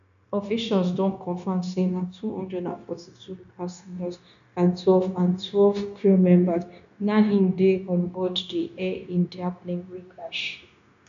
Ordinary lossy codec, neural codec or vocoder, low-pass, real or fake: none; codec, 16 kHz, 0.9 kbps, LongCat-Audio-Codec; 7.2 kHz; fake